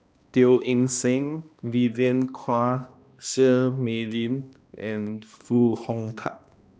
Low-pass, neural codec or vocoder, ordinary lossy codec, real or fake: none; codec, 16 kHz, 1 kbps, X-Codec, HuBERT features, trained on balanced general audio; none; fake